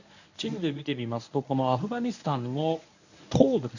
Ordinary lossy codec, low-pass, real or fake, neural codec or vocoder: none; 7.2 kHz; fake; codec, 24 kHz, 0.9 kbps, WavTokenizer, medium speech release version 1